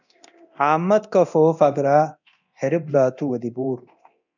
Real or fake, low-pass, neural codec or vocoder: fake; 7.2 kHz; codec, 24 kHz, 0.9 kbps, DualCodec